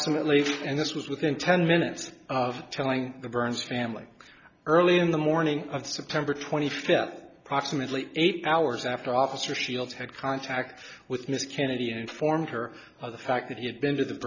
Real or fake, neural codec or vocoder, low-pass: real; none; 7.2 kHz